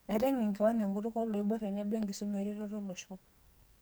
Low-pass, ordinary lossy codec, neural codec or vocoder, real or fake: none; none; codec, 44.1 kHz, 2.6 kbps, SNAC; fake